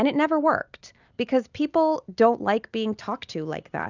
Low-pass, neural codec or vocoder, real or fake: 7.2 kHz; none; real